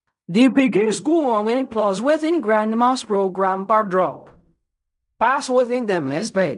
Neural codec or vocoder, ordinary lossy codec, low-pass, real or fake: codec, 16 kHz in and 24 kHz out, 0.4 kbps, LongCat-Audio-Codec, fine tuned four codebook decoder; none; 10.8 kHz; fake